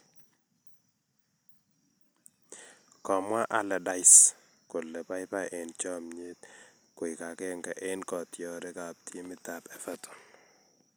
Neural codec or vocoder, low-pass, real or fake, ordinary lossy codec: none; none; real; none